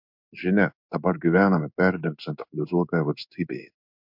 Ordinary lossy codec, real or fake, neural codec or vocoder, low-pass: MP3, 48 kbps; fake; codec, 16 kHz in and 24 kHz out, 1 kbps, XY-Tokenizer; 5.4 kHz